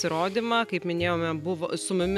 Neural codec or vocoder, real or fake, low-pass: none; real; 14.4 kHz